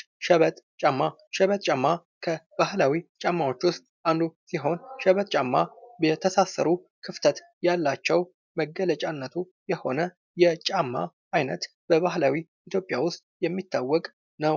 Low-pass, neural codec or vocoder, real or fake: 7.2 kHz; none; real